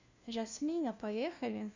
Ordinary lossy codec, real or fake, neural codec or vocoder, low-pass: Opus, 64 kbps; fake; codec, 16 kHz, 1 kbps, FunCodec, trained on LibriTTS, 50 frames a second; 7.2 kHz